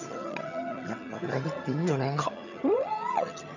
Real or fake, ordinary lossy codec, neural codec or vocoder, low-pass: fake; none; vocoder, 22.05 kHz, 80 mel bands, HiFi-GAN; 7.2 kHz